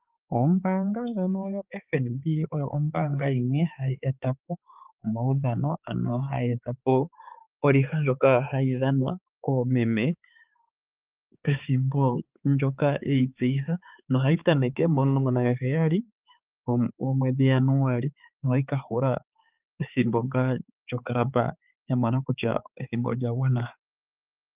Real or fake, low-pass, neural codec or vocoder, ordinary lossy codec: fake; 3.6 kHz; codec, 16 kHz, 4 kbps, X-Codec, HuBERT features, trained on balanced general audio; Opus, 24 kbps